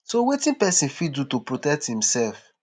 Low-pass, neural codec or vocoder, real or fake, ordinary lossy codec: none; none; real; none